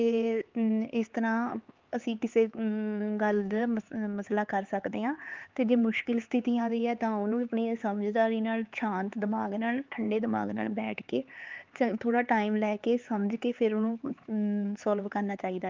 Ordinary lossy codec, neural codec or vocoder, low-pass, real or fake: Opus, 32 kbps; codec, 16 kHz, 4 kbps, X-Codec, HuBERT features, trained on LibriSpeech; 7.2 kHz; fake